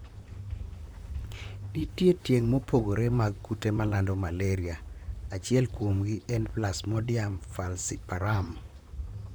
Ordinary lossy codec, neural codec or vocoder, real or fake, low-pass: none; vocoder, 44.1 kHz, 128 mel bands, Pupu-Vocoder; fake; none